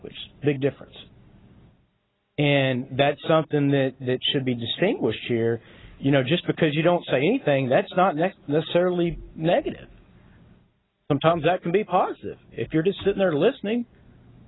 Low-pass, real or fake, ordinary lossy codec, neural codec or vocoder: 7.2 kHz; real; AAC, 16 kbps; none